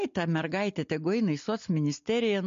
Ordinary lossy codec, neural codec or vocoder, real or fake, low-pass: MP3, 48 kbps; none; real; 7.2 kHz